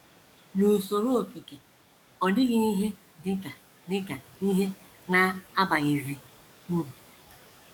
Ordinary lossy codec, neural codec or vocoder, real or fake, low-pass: none; codec, 44.1 kHz, 7.8 kbps, DAC; fake; 19.8 kHz